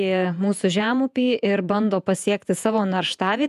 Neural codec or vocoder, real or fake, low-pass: vocoder, 48 kHz, 128 mel bands, Vocos; fake; 14.4 kHz